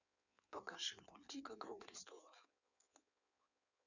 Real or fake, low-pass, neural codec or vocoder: fake; 7.2 kHz; codec, 16 kHz in and 24 kHz out, 1.1 kbps, FireRedTTS-2 codec